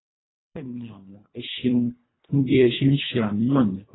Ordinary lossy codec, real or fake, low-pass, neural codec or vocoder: AAC, 16 kbps; fake; 7.2 kHz; codec, 24 kHz, 1.5 kbps, HILCodec